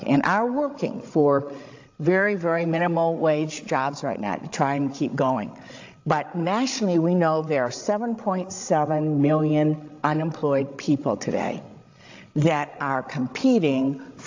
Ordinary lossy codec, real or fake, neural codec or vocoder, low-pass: AAC, 48 kbps; fake; codec, 16 kHz, 16 kbps, FreqCodec, larger model; 7.2 kHz